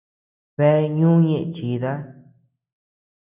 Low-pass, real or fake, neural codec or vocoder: 3.6 kHz; real; none